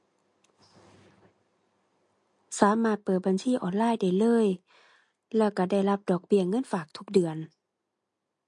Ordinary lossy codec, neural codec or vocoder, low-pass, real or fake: MP3, 48 kbps; none; 10.8 kHz; real